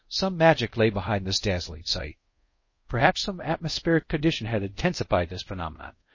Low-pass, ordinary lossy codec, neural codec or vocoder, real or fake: 7.2 kHz; MP3, 32 kbps; codec, 24 kHz, 0.5 kbps, DualCodec; fake